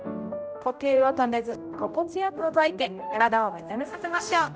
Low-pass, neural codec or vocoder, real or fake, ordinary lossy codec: none; codec, 16 kHz, 0.5 kbps, X-Codec, HuBERT features, trained on general audio; fake; none